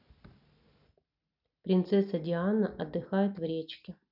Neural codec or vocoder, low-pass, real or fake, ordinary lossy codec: none; 5.4 kHz; real; none